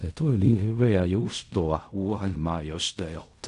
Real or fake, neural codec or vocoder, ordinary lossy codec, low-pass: fake; codec, 16 kHz in and 24 kHz out, 0.4 kbps, LongCat-Audio-Codec, fine tuned four codebook decoder; none; 10.8 kHz